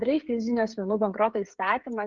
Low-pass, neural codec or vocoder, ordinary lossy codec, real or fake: 7.2 kHz; codec, 16 kHz, 16 kbps, FreqCodec, smaller model; Opus, 24 kbps; fake